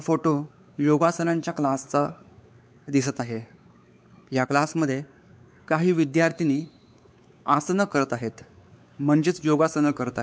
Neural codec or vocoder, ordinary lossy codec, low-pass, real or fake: codec, 16 kHz, 4 kbps, X-Codec, WavLM features, trained on Multilingual LibriSpeech; none; none; fake